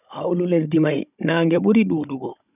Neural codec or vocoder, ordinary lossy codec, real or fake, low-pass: codec, 16 kHz, 16 kbps, FunCodec, trained on Chinese and English, 50 frames a second; none; fake; 3.6 kHz